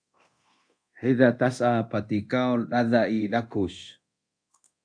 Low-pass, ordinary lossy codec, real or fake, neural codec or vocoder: 9.9 kHz; AAC, 64 kbps; fake; codec, 24 kHz, 0.9 kbps, DualCodec